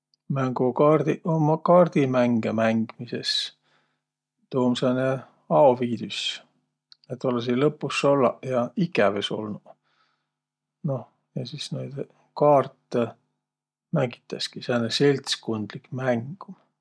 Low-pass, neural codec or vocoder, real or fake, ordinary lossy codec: none; none; real; none